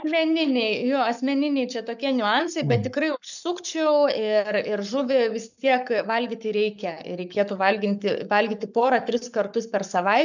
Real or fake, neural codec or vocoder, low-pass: fake; codec, 44.1 kHz, 7.8 kbps, Pupu-Codec; 7.2 kHz